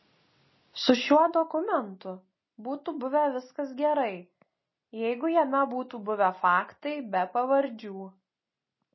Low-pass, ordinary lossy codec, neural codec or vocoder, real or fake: 7.2 kHz; MP3, 24 kbps; none; real